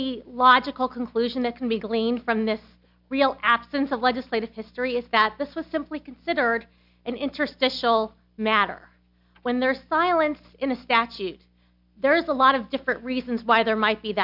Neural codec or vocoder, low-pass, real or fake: none; 5.4 kHz; real